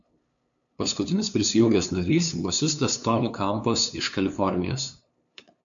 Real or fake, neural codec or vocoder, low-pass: fake; codec, 16 kHz, 2 kbps, FunCodec, trained on LibriTTS, 25 frames a second; 7.2 kHz